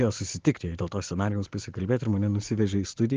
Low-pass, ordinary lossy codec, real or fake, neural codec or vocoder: 7.2 kHz; Opus, 16 kbps; real; none